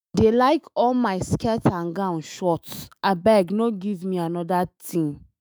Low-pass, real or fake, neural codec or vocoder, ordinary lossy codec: none; fake; autoencoder, 48 kHz, 128 numbers a frame, DAC-VAE, trained on Japanese speech; none